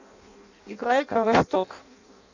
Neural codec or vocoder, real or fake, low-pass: codec, 16 kHz in and 24 kHz out, 0.6 kbps, FireRedTTS-2 codec; fake; 7.2 kHz